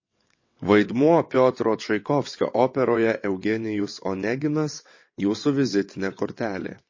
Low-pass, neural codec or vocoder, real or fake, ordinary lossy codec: 7.2 kHz; vocoder, 22.05 kHz, 80 mel bands, WaveNeXt; fake; MP3, 32 kbps